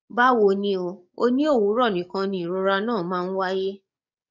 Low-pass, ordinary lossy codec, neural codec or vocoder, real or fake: 7.2 kHz; none; codec, 44.1 kHz, 7.8 kbps, DAC; fake